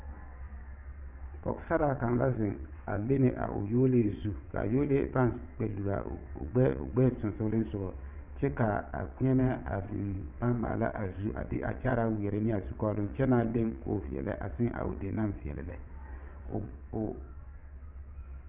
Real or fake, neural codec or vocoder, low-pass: fake; vocoder, 22.05 kHz, 80 mel bands, WaveNeXt; 3.6 kHz